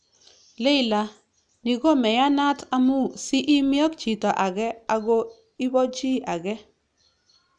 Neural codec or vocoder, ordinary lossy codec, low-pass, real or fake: none; none; 9.9 kHz; real